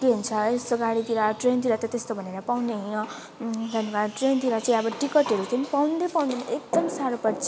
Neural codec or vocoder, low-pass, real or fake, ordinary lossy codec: none; none; real; none